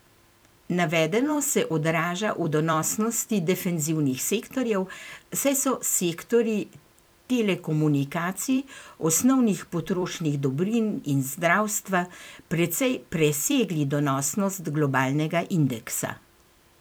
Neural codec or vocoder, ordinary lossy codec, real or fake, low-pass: vocoder, 44.1 kHz, 128 mel bands every 256 samples, BigVGAN v2; none; fake; none